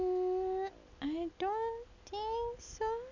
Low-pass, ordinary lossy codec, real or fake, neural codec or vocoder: 7.2 kHz; none; real; none